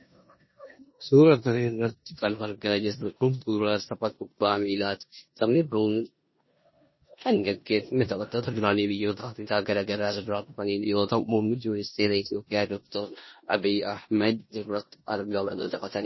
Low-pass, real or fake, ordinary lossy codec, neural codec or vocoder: 7.2 kHz; fake; MP3, 24 kbps; codec, 16 kHz in and 24 kHz out, 0.9 kbps, LongCat-Audio-Codec, four codebook decoder